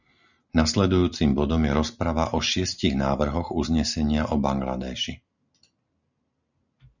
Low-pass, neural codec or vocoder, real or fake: 7.2 kHz; none; real